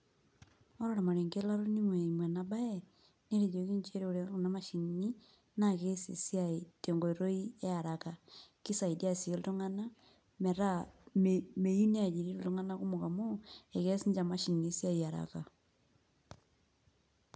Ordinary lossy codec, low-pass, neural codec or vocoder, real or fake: none; none; none; real